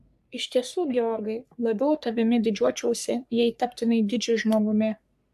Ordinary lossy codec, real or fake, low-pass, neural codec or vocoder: AAC, 96 kbps; fake; 14.4 kHz; codec, 44.1 kHz, 3.4 kbps, Pupu-Codec